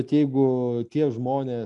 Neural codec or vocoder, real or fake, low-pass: none; real; 10.8 kHz